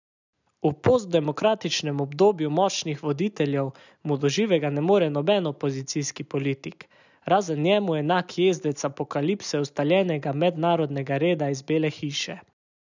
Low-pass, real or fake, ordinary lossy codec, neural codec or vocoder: 7.2 kHz; real; none; none